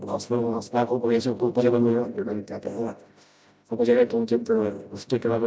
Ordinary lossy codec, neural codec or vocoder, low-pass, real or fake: none; codec, 16 kHz, 0.5 kbps, FreqCodec, smaller model; none; fake